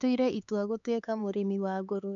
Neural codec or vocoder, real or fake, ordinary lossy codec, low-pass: codec, 16 kHz, 4 kbps, X-Codec, HuBERT features, trained on LibriSpeech; fake; none; 7.2 kHz